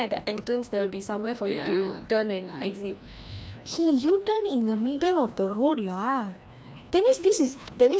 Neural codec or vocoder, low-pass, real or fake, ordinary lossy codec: codec, 16 kHz, 1 kbps, FreqCodec, larger model; none; fake; none